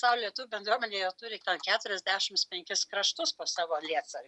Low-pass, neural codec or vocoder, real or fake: 10.8 kHz; none; real